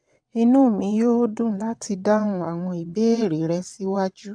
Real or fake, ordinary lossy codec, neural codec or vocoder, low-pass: fake; AAC, 64 kbps; vocoder, 22.05 kHz, 80 mel bands, WaveNeXt; 9.9 kHz